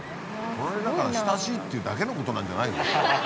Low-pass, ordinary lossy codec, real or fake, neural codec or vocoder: none; none; real; none